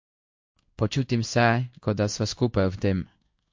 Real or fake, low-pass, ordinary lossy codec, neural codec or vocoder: fake; 7.2 kHz; MP3, 48 kbps; codec, 16 kHz in and 24 kHz out, 1 kbps, XY-Tokenizer